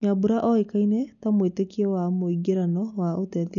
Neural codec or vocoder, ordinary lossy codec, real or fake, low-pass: none; MP3, 96 kbps; real; 7.2 kHz